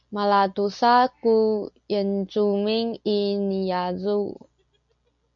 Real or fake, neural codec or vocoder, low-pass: real; none; 7.2 kHz